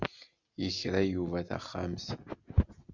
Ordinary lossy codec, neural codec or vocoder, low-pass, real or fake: Opus, 64 kbps; none; 7.2 kHz; real